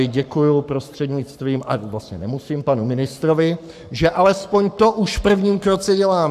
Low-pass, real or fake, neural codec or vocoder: 14.4 kHz; fake; codec, 44.1 kHz, 7.8 kbps, Pupu-Codec